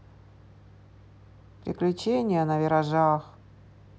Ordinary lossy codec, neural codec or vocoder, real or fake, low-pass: none; none; real; none